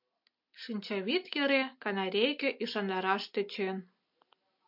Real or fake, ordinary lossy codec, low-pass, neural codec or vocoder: real; MP3, 48 kbps; 5.4 kHz; none